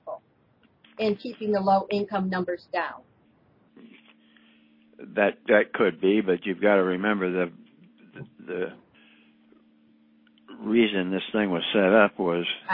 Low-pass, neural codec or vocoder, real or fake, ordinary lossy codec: 5.4 kHz; none; real; MP3, 24 kbps